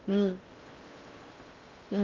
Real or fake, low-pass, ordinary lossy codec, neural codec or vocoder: fake; 7.2 kHz; Opus, 32 kbps; codec, 16 kHz in and 24 kHz out, 0.6 kbps, FocalCodec, streaming, 4096 codes